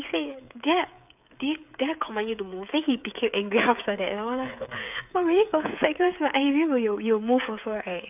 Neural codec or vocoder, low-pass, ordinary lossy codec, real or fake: codec, 16 kHz, 8 kbps, FreqCodec, smaller model; 3.6 kHz; none; fake